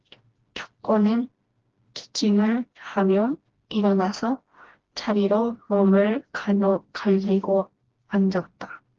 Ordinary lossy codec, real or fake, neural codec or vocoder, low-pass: Opus, 16 kbps; fake; codec, 16 kHz, 1 kbps, FreqCodec, smaller model; 7.2 kHz